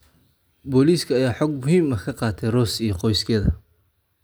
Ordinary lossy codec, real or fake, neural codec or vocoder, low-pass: none; real; none; none